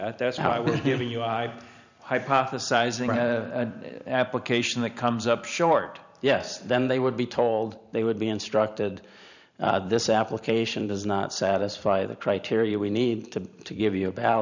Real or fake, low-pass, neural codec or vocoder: fake; 7.2 kHz; vocoder, 44.1 kHz, 128 mel bands every 512 samples, BigVGAN v2